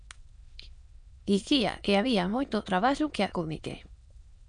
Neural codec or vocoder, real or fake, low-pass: autoencoder, 22.05 kHz, a latent of 192 numbers a frame, VITS, trained on many speakers; fake; 9.9 kHz